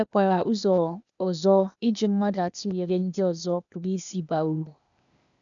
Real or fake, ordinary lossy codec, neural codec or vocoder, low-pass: fake; none; codec, 16 kHz, 0.8 kbps, ZipCodec; 7.2 kHz